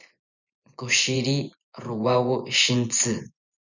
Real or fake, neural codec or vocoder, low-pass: fake; vocoder, 24 kHz, 100 mel bands, Vocos; 7.2 kHz